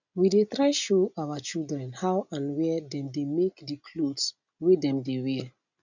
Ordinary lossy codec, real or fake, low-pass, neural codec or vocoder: none; real; 7.2 kHz; none